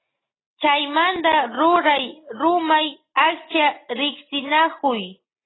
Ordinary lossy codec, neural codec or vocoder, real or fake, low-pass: AAC, 16 kbps; none; real; 7.2 kHz